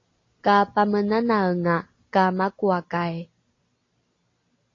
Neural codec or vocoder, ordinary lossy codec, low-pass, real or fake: none; AAC, 32 kbps; 7.2 kHz; real